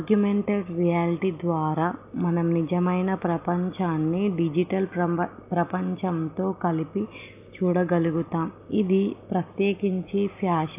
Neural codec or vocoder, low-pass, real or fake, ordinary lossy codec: none; 3.6 kHz; real; AAC, 32 kbps